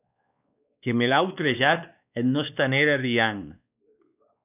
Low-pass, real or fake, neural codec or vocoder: 3.6 kHz; fake; codec, 16 kHz, 2 kbps, X-Codec, WavLM features, trained on Multilingual LibriSpeech